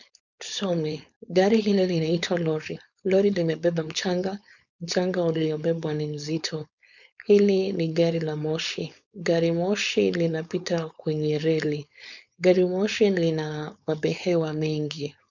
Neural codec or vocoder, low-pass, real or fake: codec, 16 kHz, 4.8 kbps, FACodec; 7.2 kHz; fake